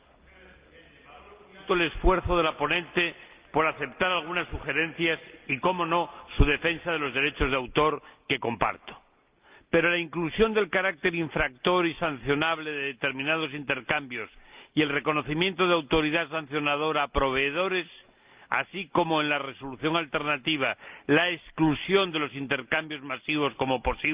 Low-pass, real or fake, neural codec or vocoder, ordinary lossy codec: 3.6 kHz; real; none; Opus, 24 kbps